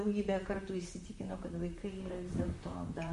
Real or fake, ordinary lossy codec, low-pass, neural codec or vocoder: fake; MP3, 48 kbps; 14.4 kHz; vocoder, 44.1 kHz, 128 mel bands, Pupu-Vocoder